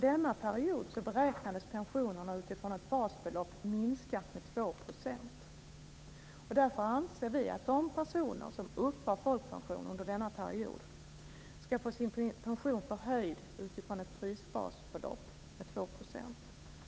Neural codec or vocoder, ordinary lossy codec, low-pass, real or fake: codec, 16 kHz, 8 kbps, FunCodec, trained on Chinese and English, 25 frames a second; none; none; fake